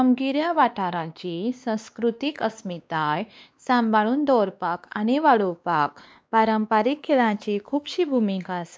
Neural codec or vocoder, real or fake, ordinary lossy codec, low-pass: codec, 16 kHz, 2 kbps, X-Codec, WavLM features, trained on Multilingual LibriSpeech; fake; none; none